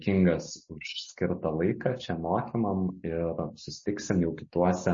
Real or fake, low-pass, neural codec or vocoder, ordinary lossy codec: real; 7.2 kHz; none; MP3, 32 kbps